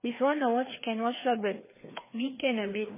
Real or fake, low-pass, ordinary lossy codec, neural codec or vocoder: fake; 3.6 kHz; MP3, 16 kbps; codec, 16 kHz, 2 kbps, FreqCodec, larger model